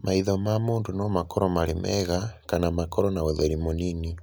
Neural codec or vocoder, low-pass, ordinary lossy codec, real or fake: none; none; none; real